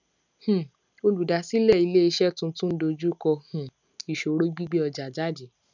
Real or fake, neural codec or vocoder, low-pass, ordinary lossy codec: real; none; 7.2 kHz; none